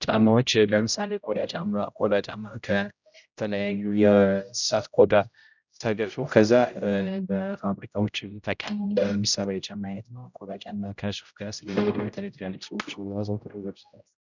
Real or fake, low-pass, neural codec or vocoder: fake; 7.2 kHz; codec, 16 kHz, 0.5 kbps, X-Codec, HuBERT features, trained on general audio